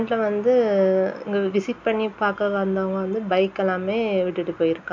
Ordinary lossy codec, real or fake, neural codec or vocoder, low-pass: MP3, 48 kbps; real; none; 7.2 kHz